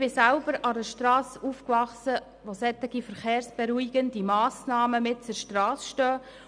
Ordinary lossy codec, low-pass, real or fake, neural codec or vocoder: none; 9.9 kHz; real; none